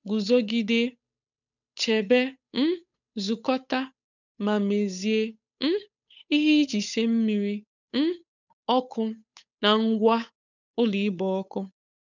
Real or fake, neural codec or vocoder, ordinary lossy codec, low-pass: fake; codec, 16 kHz, 8 kbps, FunCodec, trained on Chinese and English, 25 frames a second; none; 7.2 kHz